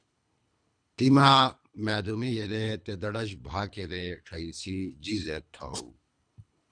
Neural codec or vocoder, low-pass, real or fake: codec, 24 kHz, 3 kbps, HILCodec; 9.9 kHz; fake